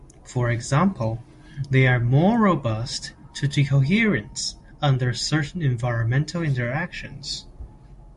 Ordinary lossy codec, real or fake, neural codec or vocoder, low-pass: MP3, 48 kbps; real; none; 14.4 kHz